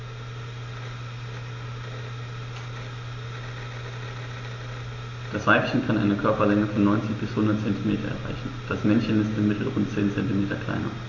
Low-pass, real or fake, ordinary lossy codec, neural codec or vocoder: 7.2 kHz; real; AAC, 32 kbps; none